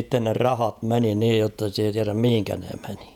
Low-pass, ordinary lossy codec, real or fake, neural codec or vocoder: 19.8 kHz; none; fake; vocoder, 48 kHz, 128 mel bands, Vocos